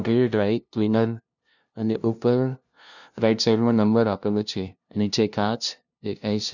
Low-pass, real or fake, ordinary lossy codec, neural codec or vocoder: 7.2 kHz; fake; none; codec, 16 kHz, 0.5 kbps, FunCodec, trained on LibriTTS, 25 frames a second